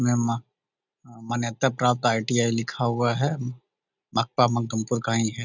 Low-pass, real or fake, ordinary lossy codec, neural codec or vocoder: 7.2 kHz; real; none; none